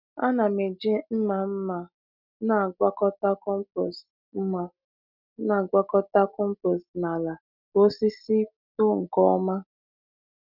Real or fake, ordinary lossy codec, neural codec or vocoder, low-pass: real; none; none; 5.4 kHz